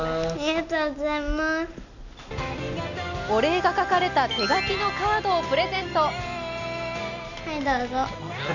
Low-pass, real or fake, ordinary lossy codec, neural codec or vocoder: 7.2 kHz; real; none; none